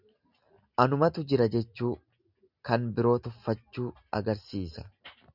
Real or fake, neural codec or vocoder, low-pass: real; none; 5.4 kHz